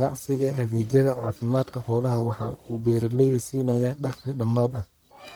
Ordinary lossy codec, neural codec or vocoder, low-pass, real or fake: none; codec, 44.1 kHz, 1.7 kbps, Pupu-Codec; none; fake